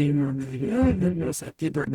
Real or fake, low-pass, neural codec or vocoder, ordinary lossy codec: fake; 19.8 kHz; codec, 44.1 kHz, 0.9 kbps, DAC; Opus, 64 kbps